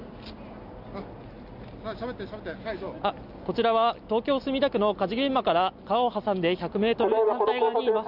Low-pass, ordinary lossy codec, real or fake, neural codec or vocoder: 5.4 kHz; none; real; none